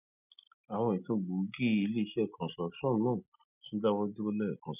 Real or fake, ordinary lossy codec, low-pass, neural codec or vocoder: real; none; 3.6 kHz; none